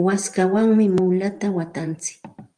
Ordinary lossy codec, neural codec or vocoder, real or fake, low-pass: Opus, 32 kbps; vocoder, 44.1 kHz, 128 mel bands every 512 samples, BigVGAN v2; fake; 9.9 kHz